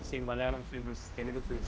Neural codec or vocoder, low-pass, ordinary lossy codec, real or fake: codec, 16 kHz, 1 kbps, X-Codec, HuBERT features, trained on general audio; none; none; fake